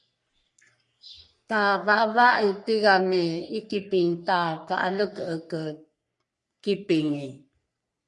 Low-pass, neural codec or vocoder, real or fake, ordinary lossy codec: 10.8 kHz; codec, 44.1 kHz, 3.4 kbps, Pupu-Codec; fake; MP3, 64 kbps